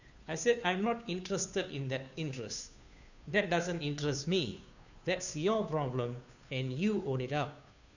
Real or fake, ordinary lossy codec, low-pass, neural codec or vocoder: fake; none; 7.2 kHz; codec, 16 kHz, 2 kbps, FunCodec, trained on Chinese and English, 25 frames a second